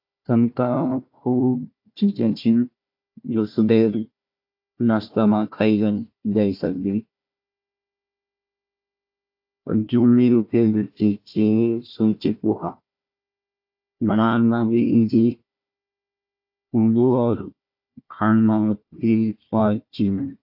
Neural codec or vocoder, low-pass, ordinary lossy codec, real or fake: codec, 16 kHz, 1 kbps, FunCodec, trained on Chinese and English, 50 frames a second; 5.4 kHz; AAC, 48 kbps; fake